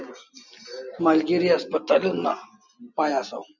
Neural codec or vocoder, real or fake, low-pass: none; real; 7.2 kHz